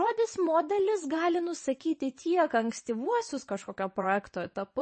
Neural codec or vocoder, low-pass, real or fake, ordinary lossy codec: vocoder, 22.05 kHz, 80 mel bands, WaveNeXt; 9.9 kHz; fake; MP3, 32 kbps